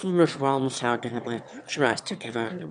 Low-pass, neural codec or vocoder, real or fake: 9.9 kHz; autoencoder, 22.05 kHz, a latent of 192 numbers a frame, VITS, trained on one speaker; fake